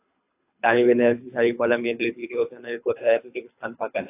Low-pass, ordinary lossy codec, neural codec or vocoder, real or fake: 3.6 kHz; AAC, 24 kbps; codec, 24 kHz, 3 kbps, HILCodec; fake